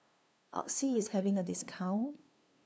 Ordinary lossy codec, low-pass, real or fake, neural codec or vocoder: none; none; fake; codec, 16 kHz, 2 kbps, FunCodec, trained on LibriTTS, 25 frames a second